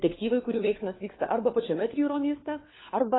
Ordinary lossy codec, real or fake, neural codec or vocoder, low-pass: AAC, 16 kbps; fake; codec, 16 kHz, 4 kbps, FunCodec, trained on LibriTTS, 50 frames a second; 7.2 kHz